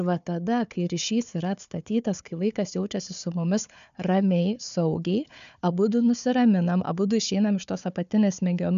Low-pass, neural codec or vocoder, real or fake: 7.2 kHz; codec, 16 kHz, 4 kbps, FunCodec, trained on Chinese and English, 50 frames a second; fake